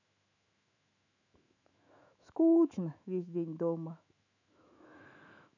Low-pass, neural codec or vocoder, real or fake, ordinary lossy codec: 7.2 kHz; autoencoder, 48 kHz, 128 numbers a frame, DAC-VAE, trained on Japanese speech; fake; none